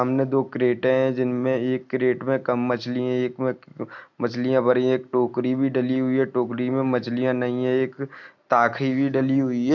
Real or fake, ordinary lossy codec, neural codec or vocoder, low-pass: real; none; none; 7.2 kHz